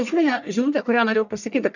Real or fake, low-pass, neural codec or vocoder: fake; 7.2 kHz; codec, 44.1 kHz, 1.7 kbps, Pupu-Codec